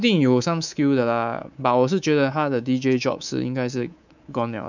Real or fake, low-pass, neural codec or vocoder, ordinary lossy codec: fake; 7.2 kHz; codec, 24 kHz, 3.1 kbps, DualCodec; none